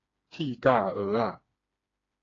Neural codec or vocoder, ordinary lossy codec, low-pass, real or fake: codec, 16 kHz, 2 kbps, FreqCodec, smaller model; AAC, 32 kbps; 7.2 kHz; fake